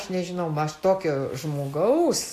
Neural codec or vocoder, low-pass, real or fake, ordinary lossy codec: none; 14.4 kHz; real; AAC, 64 kbps